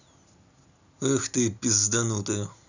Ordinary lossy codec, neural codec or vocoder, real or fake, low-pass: none; none; real; 7.2 kHz